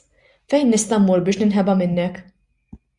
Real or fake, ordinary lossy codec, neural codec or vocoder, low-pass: real; Opus, 64 kbps; none; 10.8 kHz